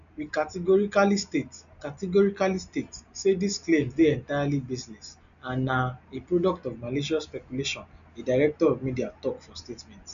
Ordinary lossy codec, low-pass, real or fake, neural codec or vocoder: MP3, 96 kbps; 7.2 kHz; real; none